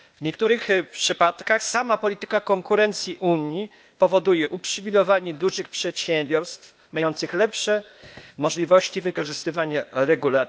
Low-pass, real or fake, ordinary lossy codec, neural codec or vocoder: none; fake; none; codec, 16 kHz, 0.8 kbps, ZipCodec